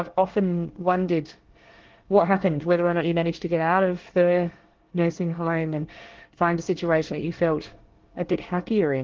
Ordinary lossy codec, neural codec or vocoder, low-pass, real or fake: Opus, 16 kbps; codec, 24 kHz, 1 kbps, SNAC; 7.2 kHz; fake